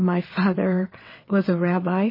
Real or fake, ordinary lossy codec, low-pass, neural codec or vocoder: fake; MP3, 24 kbps; 5.4 kHz; vocoder, 22.05 kHz, 80 mel bands, WaveNeXt